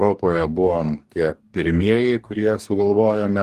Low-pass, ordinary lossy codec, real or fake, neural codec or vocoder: 14.4 kHz; Opus, 24 kbps; fake; codec, 44.1 kHz, 2.6 kbps, DAC